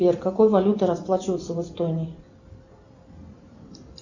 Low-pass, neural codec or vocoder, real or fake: 7.2 kHz; vocoder, 44.1 kHz, 128 mel bands every 256 samples, BigVGAN v2; fake